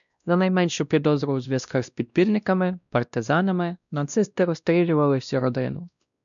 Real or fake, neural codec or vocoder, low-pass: fake; codec, 16 kHz, 1 kbps, X-Codec, WavLM features, trained on Multilingual LibriSpeech; 7.2 kHz